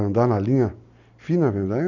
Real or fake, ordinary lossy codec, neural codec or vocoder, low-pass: real; none; none; 7.2 kHz